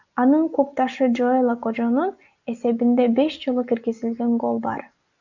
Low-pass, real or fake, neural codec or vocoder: 7.2 kHz; real; none